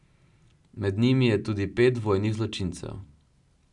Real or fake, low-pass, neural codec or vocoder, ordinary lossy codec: real; 10.8 kHz; none; none